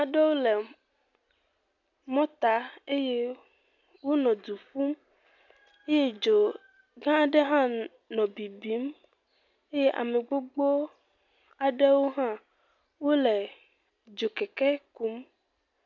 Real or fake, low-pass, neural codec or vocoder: real; 7.2 kHz; none